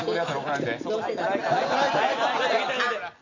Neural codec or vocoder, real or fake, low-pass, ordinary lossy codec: none; real; 7.2 kHz; none